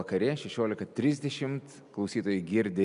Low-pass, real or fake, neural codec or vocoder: 10.8 kHz; real; none